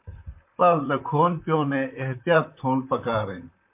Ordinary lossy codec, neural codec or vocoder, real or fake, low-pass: MP3, 32 kbps; vocoder, 44.1 kHz, 128 mel bands, Pupu-Vocoder; fake; 3.6 kHz